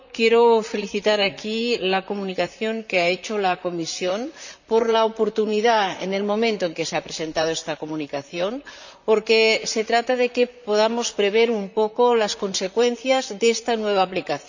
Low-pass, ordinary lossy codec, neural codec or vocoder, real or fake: 7.2 kHz; none; vocoder, 44.1 kHz, 128 mel bands, Pupu-Vocoder; fake